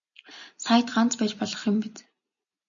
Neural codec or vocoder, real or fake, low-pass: none; real; 7.2 kHz